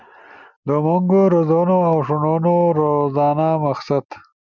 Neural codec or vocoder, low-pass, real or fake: none; 7.2 kHz; real